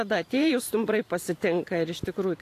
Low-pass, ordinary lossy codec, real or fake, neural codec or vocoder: 14.4 kHz; AAC, 64 kbps; fake; vocoder, 44.1 kHz, 128 mel bands every 512 samples, BigVGAN v2